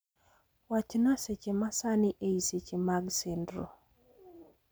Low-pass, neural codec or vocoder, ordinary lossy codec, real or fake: none; none; none; real